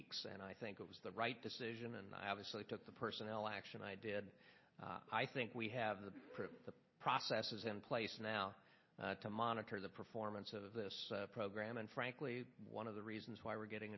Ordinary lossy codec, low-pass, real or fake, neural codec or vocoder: MP3, 24 kbps; 7.2 kHz; real; none